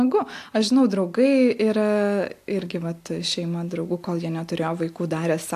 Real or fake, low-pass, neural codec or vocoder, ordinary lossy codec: real; 14.4 kHz; none; AAC, 64 kbps